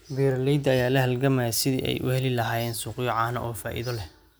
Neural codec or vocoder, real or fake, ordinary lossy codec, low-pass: none; real; none; none